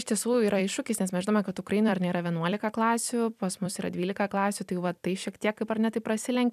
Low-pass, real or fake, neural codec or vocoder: 14.4 kHz; fake; vocoder, 44.1 kHz, 128 mel bands every 256 samples, BigVGAN v2